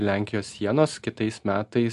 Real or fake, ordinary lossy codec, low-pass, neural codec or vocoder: real; MP3, 64 kbps; 10.8 kHz; none